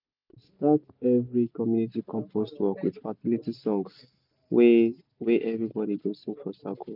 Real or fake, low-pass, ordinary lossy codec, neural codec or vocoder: real; 5.4 kHz; none; none